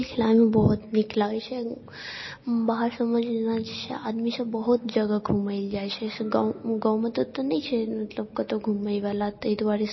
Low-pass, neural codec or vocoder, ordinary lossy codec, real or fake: 7.2 kHz; none; MP3, 24 kbps; real